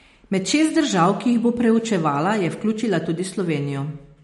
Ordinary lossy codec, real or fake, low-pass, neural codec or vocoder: MP3, 48 kbps; real; 19.8 kHz; none